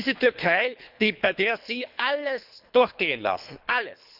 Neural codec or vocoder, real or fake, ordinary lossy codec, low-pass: codec, 24 kHz, 3 kbps, HILCodec; fake; none; 5.4 kHz